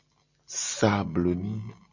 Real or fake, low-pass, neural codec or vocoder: real; 7.2 kHz; none